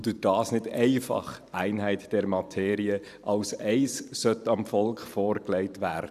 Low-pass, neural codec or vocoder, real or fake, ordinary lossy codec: 14.4 kHz; vocoder, 44.1 kHz, 128 mel bands every 512 samples, BigVGAN v2; fake; none